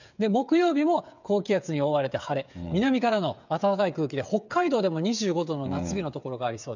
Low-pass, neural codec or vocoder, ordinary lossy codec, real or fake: 7.2 kHz; codec, 16 kHz, 8 kbps, FreqCodec, smaller model; none; fake